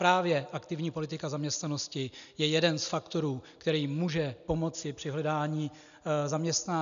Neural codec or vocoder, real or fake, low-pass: none; real; 7.2 kHz